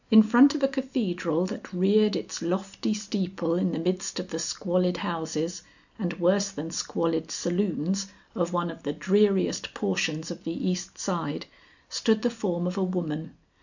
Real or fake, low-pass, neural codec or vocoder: real; 7.2 kHz; none